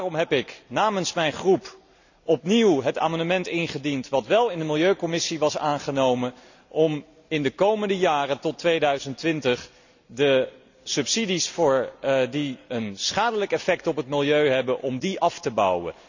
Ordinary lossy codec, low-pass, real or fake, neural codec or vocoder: none; 7.2 kHz; real; none